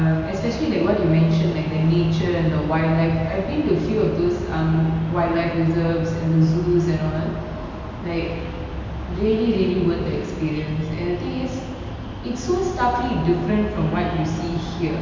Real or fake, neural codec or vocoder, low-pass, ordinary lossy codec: real; none; 7.2 kHz; MP3, 64 kbps